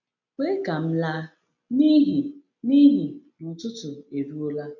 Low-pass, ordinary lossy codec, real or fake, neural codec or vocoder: 7.2 kHz; none; real; none